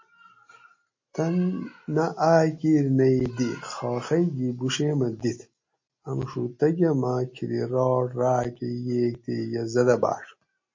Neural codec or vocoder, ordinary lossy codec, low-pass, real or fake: none; MP3, 32 kbps; 7.2 kHz; real